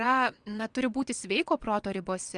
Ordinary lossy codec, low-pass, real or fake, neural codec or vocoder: Opus, 32 kbps; 9.9 kHz; fake; vocoder, 22.05 kHz, 80 mel bands, WaveNeXt